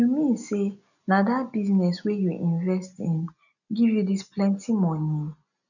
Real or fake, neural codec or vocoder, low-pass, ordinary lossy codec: real; none; 7.2 kHz; none